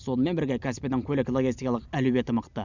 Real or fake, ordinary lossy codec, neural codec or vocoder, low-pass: real; none; none; 7.2 kHz